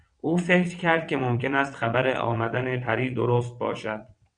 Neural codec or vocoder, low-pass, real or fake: vocoder, 22.05 kHz, 80 mel bands, WaveNeXt; 9.9 kHz; fake